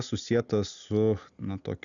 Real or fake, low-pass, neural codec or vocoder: real; 7.2 kHz; none